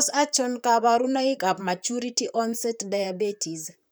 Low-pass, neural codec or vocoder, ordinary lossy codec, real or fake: none; vocoder, 44.1 kHz, 128 mel bands, Pupu-Vocoder; none; fake